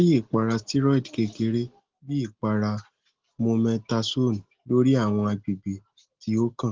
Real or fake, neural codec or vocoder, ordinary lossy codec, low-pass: real; none; Opus, 16 kbps; 7.2 kHz